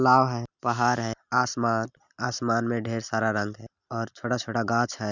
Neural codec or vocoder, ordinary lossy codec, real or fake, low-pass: none; none; real; 7.2 kHz